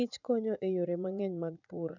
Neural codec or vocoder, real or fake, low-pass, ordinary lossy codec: vocoder, 44.1 kHz, 80 mel bands, Vocos; fake; 7.2 kHz; none